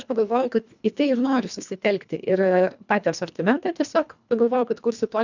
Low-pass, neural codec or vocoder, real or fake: 7.2 kHz; codec, 24 kHz, 1.5 kbps, HILCodec; fake